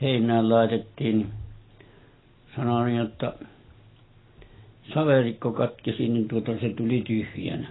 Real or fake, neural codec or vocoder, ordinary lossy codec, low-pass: real; none; AAC, 16 kbps; 7.2 kHz